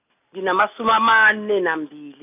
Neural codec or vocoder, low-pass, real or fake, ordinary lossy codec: none; 3.6 kHz; real; none